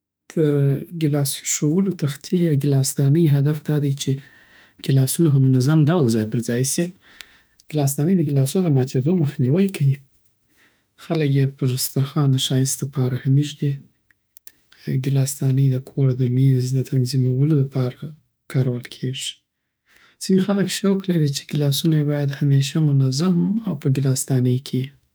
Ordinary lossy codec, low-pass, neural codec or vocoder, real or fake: none; none; autoencoder, 48 kHz, 32 numbers a frame, DAC-VAE, trained on Japanese speech; fake